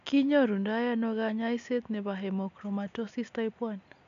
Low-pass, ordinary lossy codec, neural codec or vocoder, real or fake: 7.2 kHz; AAC, 64 kbps; none; real